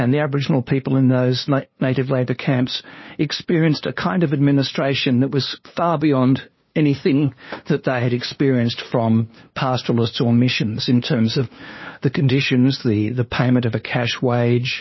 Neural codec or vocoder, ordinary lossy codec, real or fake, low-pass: codec, 16 kHz, 2 kbps, FunCodec, trained on LibriTTS, 25 frames a second; MP3, 24 kbps; fake; 7.2 kHz